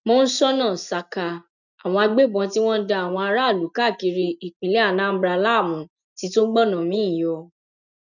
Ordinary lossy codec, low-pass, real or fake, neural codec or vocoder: none; 7.2 kHz; real; none